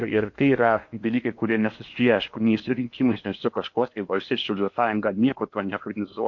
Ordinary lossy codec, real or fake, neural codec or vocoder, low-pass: MP3, 48 kbps; fake; codec, 16 kHz in and 24 kHz out, 0.8 kbps, FocalCodec, streaming, 65536 codes; 7.2 kHz